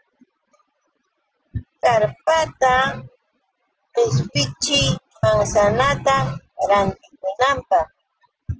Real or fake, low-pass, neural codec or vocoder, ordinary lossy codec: real; 7.2 kHz; none; Opus, 16 kbps